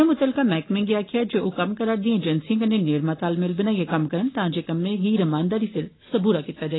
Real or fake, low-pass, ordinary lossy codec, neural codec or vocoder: real; 7.2 kHz; AAC, 16 kbps; none